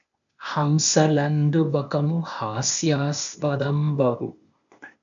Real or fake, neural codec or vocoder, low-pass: fake; codec, 16 kHz, 0.8 kbps, ZipCodec; 7.2 kHz